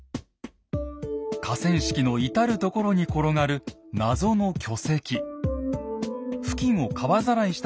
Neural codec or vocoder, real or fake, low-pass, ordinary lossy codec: none; real; none; none